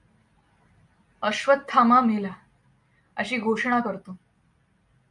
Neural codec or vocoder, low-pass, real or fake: none; 10.8 kHz; real